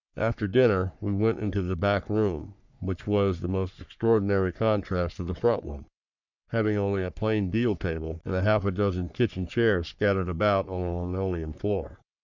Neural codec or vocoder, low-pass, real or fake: codec, 44.1 kHz, 3.4 kbps, Pupu-Codec; 7.2 kHz; fake